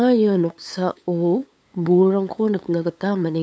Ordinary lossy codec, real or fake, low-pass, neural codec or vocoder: none; fake; none; codec, 16 kHz, 8 kbps, FunCodec, trained on LibriTTS, 25 frames a second